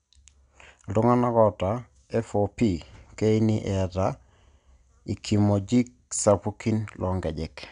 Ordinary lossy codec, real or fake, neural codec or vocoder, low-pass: none; real; none; 9.9 kHz